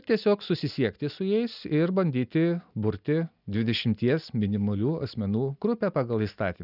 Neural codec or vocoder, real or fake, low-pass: none; real; 5.4 kHz